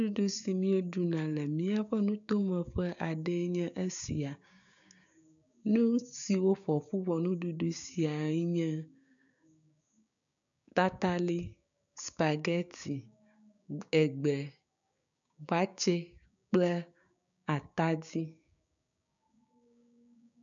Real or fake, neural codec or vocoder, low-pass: fake; codec, 16 kHz, 6 kbps, DAC; 7.2 kHz